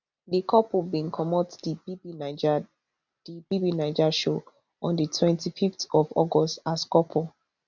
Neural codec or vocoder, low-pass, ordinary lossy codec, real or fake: none; 7.2 kHz; none; real